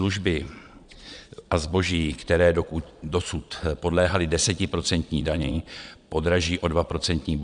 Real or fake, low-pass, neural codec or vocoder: fake; 9.9 kHz; vocoder, 22.05 kHz, 80 mel bands, Vocos